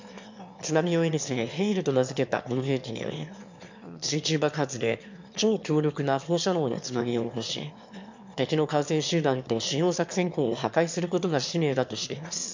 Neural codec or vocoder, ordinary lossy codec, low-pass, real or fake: autoencoder, 22.05 kHz, a latent of 192 numbers a frame, VITS, trained on one speaker; MP3, 64 kbps; 7.2 kHz; fake